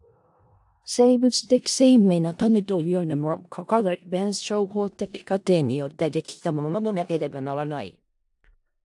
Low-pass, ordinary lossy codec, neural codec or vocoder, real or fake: 10.8 kHz; AAC, 64 kbps; codec, 16 kHz in and 24 kHz out, 0.4 kbps, LongCat-Audio-Codec, four codebook decoder; fake